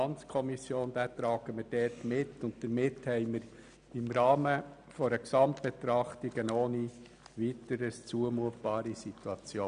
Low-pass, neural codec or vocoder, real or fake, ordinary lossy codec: 9.9 kHz; none; real; none